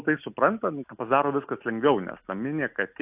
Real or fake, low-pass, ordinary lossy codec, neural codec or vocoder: real; 3.6 kHz; AAC, 32 kbps; none